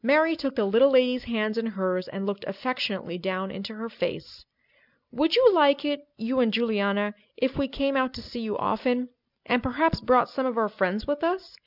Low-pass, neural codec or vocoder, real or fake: 5.4 kHz; none; real